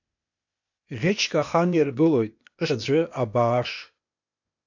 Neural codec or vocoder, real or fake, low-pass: codec, 16 kHz, 0.8 kbps, ZipCodec; fake; 7.2 kHz